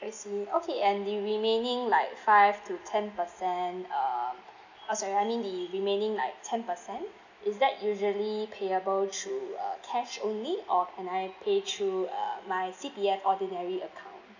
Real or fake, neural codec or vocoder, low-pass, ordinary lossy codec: real; none; 7.2 kHz; none